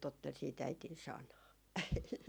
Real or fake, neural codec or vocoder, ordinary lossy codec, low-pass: real; none; none; none